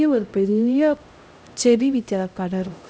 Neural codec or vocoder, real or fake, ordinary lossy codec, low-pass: codec, 16 kHz, 0.5 kbps, X-Codec, HuBERT features, trained on LibriSpeech; fake; none; none